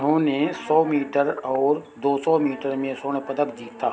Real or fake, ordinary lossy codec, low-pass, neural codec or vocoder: real; none; none; none